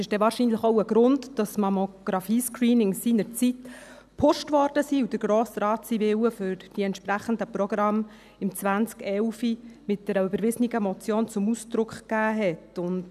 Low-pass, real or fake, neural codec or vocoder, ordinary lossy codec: 14.4 kHz; real; none; none